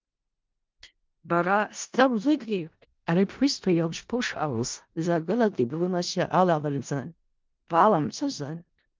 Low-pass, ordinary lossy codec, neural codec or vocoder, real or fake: 7.2 kHz; Opus, 24 kbps; codec, 16 kHz in and 24 kHz out, 0.4 kbps, LongCat-Audio-Codec, four codebook decoder; fake